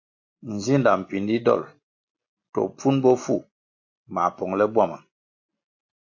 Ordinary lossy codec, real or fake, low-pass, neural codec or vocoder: AAC, 48 kbps; real; 7.2 kHz; none